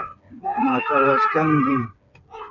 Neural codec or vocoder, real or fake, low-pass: codec, 16 kHz, 4 kbps, FreqCodec, smaller model; fake; 7.2 kHz